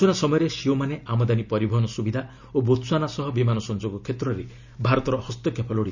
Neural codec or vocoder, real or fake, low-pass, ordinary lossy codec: none; real; 7.2 kHz; none